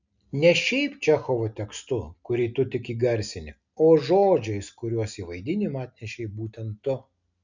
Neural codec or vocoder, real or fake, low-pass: none; real; 7.2 kHz